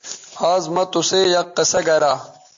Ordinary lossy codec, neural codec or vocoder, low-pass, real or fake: MP3, 48 kbps; none; 7.2 kHz; real